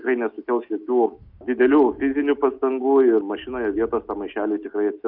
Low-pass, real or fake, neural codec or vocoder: 5.4 kHz; real; none